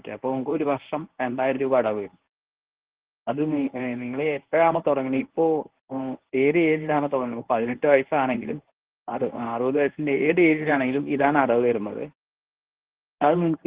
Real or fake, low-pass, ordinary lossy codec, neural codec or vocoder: fake; 3.6 kHz; Opus, 16 kbps; codec, 24 kHz, 0.9 kbps, WavTokenizer, medium speech release version 1